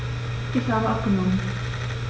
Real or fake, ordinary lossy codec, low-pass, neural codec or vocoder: real; none; none; none